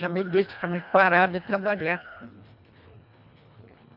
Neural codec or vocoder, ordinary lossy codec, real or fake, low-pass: codec, 24 kHz, 1.5 kbps, HILCodec; none; fake; 5.4 kHz